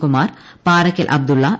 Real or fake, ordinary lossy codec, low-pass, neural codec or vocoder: real; none; none; none